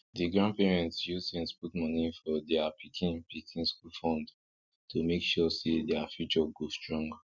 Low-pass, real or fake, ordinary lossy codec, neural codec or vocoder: 7.2 kHz; real; none; none